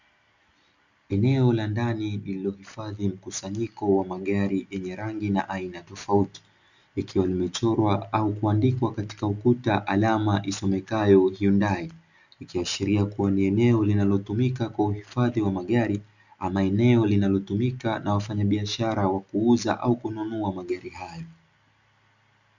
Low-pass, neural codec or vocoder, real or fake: 7.2 kHz; none; real